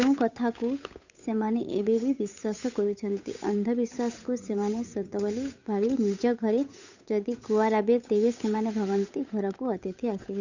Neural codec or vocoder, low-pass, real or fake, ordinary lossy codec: codec, 16 kHz, 8 kbps, FunCodec, trained on Chinese and English, 25 frames a second; 7.2 kHz; fake; none